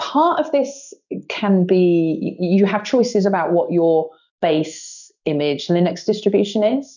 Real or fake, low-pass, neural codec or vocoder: fake; 7.2 kHz; codec, 16 kHz in and 24 kHz out, 1 kbps, XY-Tokenizer